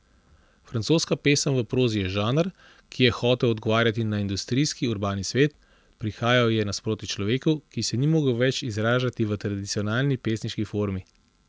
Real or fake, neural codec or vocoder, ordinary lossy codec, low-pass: real; none; none; none